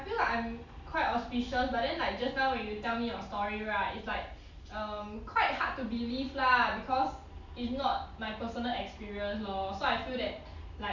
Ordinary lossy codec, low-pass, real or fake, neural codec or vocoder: none; 7.2 kHz; real; none